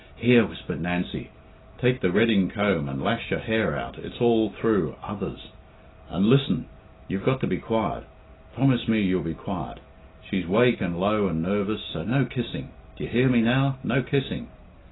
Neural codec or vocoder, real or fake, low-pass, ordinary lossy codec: none; real; 7.2 kHz; AAC, 16 kbps